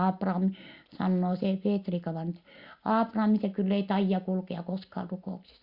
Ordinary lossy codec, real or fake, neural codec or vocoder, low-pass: Opus, 64 kbps; real; none; 5.4 kHz